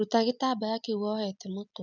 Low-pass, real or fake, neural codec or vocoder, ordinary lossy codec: 7.2 kHz; real; none; none